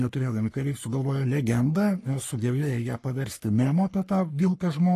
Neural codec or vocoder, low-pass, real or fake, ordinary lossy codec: codec, 44.1 kHz, 3.4 kbps, Pupu-Codec; 14.4 kHz; fake; AAC, 48 kbps